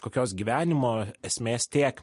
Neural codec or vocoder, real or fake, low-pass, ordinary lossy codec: none; real; 14.4 kHz; MP3, 48 kbps